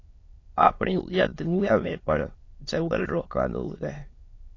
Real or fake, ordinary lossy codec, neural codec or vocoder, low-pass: fake; AAC, 32 kbps; autoencoder, 22.05 kHz, a latent of 192 numbers a frame, VITS, trained on many speakers; 7.2 kHz